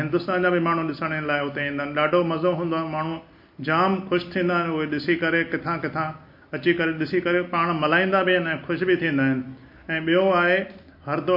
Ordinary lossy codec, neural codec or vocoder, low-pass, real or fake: MP3, 32 kbps; none; 5.4 kHz; real